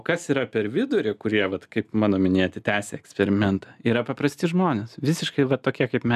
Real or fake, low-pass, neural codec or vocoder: real; 14.4 kHz; none